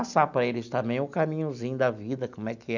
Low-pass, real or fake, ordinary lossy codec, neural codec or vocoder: 7.2 kHz; real; none; none